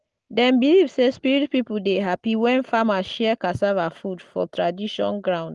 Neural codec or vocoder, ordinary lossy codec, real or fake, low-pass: none; Opus, 24 kbps; real; 10.8 kHz